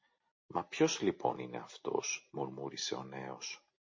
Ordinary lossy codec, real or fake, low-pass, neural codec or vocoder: MP3, 32 kbps; real; 7.2 kHz; none